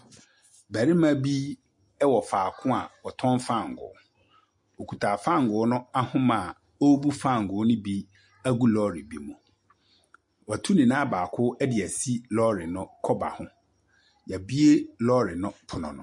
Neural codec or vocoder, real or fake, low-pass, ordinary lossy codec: none; real; 10.8 kHz; MP3, 48 kbps